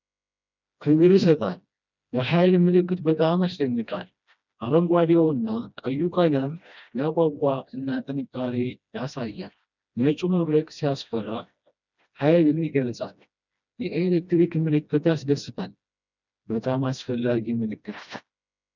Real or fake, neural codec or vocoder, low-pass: fake; codec, 16 kHz, 1 kbps, FreqCodec, smaller model; 7.2 kHz